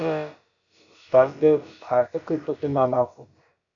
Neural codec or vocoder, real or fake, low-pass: codec, 16 kHz, about 1 kbps, DyCAST, with the encoder's durations; fake; 7.2 kHz